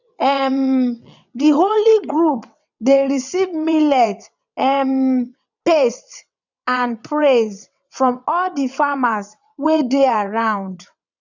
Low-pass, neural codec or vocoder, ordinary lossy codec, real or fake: 7.2 kHz; vocoder, 22.05 kHz, 80 mel bands, WaveNeXt; none; fake